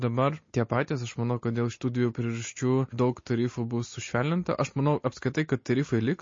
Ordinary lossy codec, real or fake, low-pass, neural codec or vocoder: MP3, 32 kbps; real; 7.2 kHz; none